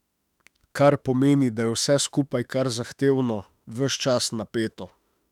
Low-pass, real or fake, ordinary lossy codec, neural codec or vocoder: 19.8 kHz; fake; none; autoencoder, 48 kHz, 32 numbers a frame, DAC-VAE, trained on Japanese speech